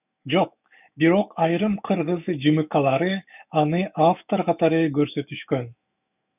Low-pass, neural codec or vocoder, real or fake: 3.6 kHz; autoencoder, 48 kHz, 128 numbers a frame, DAC-VAE, trained on Japanese speech; fake